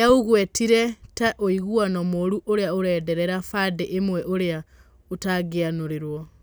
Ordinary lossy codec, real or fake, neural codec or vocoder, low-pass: none; real; none; none